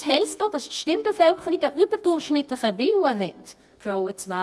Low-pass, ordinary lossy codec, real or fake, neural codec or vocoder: none; none; fake; codec, 24 kHz, 0.9 kbps, WavTokenizer, medium music audio release